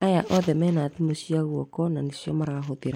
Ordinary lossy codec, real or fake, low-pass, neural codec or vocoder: MP3, 96 kbps; real; 19.8 kHz; none